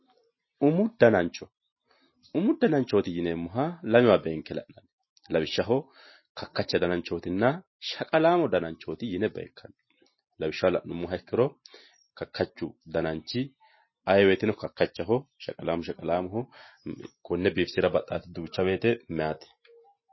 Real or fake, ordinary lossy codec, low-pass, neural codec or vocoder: real; MP3, 24 kbps; 7.2 kHz; none